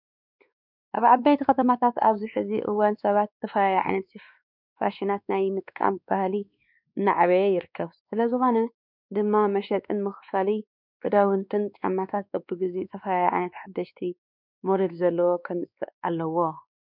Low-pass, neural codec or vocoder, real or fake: 5.4 kHz; codec, 16 kHz, 2 kbps, X-Codec, WavLM features, trained on Multilingual LibriSpeech; fake